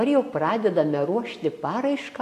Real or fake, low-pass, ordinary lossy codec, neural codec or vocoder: real; 14.4 kHz; AAC, 64 kbps; none